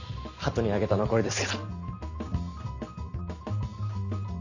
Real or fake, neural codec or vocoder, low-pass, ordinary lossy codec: real; none; 7.2 kHz; none